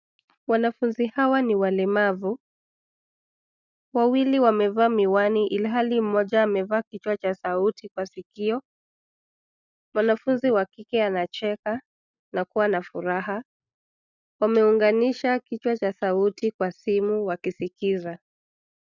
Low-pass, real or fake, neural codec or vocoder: 7.2 kHz; real; none